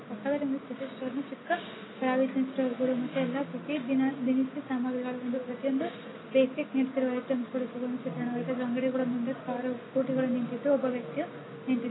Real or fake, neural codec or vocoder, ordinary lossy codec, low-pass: real; none; AAC, 16 kbps; 7.2 kHz